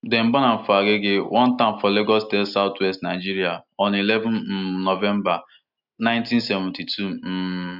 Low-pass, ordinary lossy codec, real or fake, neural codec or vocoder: 5.4 kHz; none; real; none